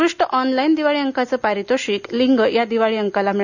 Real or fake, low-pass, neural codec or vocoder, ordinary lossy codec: real; 7.2 kHz; none; none